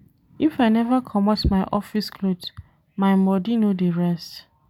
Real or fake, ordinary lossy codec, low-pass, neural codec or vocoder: real; none; 19.8 kHz; none